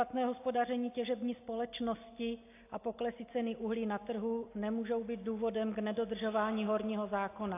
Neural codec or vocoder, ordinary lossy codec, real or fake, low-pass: none; AAC, 24 kbps; real; 3.6 kHz